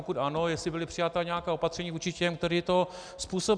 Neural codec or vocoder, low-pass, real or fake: none; 9.9 kHz; real